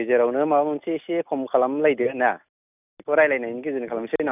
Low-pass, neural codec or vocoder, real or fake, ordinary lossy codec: 3.6 kHz; none; real; none